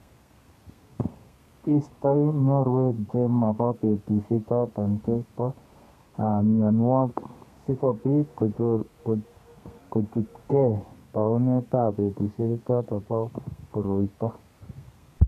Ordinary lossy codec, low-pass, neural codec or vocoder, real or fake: MP3, 96 kbps; 14.4 kHz; codec, 32 kHz, 1.9 kbps, SNAC; fake